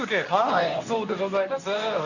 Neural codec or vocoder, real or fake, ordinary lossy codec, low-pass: codec, 24 kHz, 0.9 kbps, WavTokenizer, medium speech release version 2; fake; none; 7.2 kHz